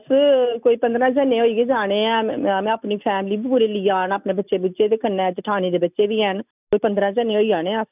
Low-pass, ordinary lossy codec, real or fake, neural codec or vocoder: 3.6 kHz; none; real; none